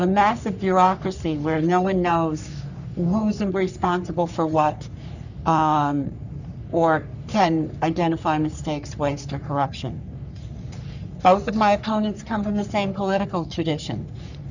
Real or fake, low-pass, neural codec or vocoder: fake; 7.2 kHz; codec, 44.1 kHz, 3.4 kbps, Pupu-Codec